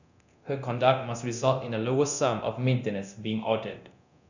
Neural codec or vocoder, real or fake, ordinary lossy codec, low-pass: codec, 24 kHz, 0.9 kbps, DualCodec; fake; none; 7.2 kHz